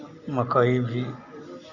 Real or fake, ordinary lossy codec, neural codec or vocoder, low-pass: real; none; none; 7.2 kHz